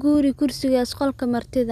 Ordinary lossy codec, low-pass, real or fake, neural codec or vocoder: none; 14.4 kHz; real; none